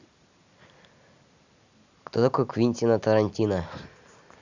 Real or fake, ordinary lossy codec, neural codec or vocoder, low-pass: real; Opus, 64 kbps; none; 7.2 kHz